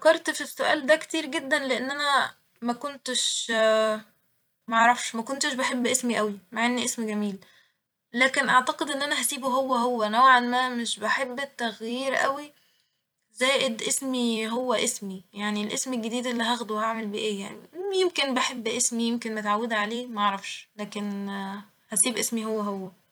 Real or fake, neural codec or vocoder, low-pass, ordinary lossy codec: fake; vocoder, 44.1 kHz, 128 mel bands every 256 samples, BigVGAN v2; none; none